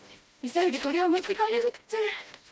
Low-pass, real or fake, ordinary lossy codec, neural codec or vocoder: none; fake; none; codec, 16 kHz, 1 kbps, FreqCodec, smaller model